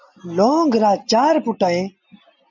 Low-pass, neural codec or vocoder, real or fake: 7.2 kHz; none; real